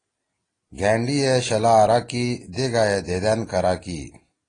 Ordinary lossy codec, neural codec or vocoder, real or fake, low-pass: AAC, 32 kbps; none; real; 9.9 kHz